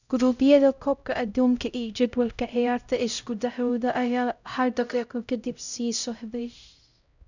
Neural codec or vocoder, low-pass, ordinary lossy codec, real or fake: codec, 16 kHz, 0.5 kbps, X-Codec, HuBERT features, trained on LibriSpeech; 7.2 kHz; none; fake